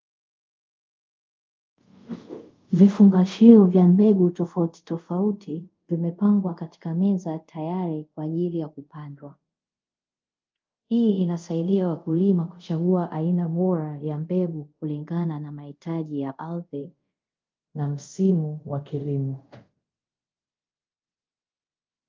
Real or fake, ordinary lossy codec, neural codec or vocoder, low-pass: fake; Opus, 24 kbps; codec, 24 kHz, 0.5 kbps, DualCodec; 7.2 kHz